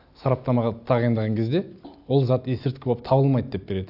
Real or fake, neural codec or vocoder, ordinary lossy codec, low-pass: real; none; AAC, 48 kbps; 5.4 kHz